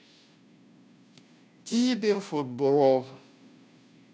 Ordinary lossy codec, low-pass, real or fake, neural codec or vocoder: none; none; fake; codec, 16 kHz, 0.5 kbps, FunCodec, trained on Chinese and English, 25 frames a second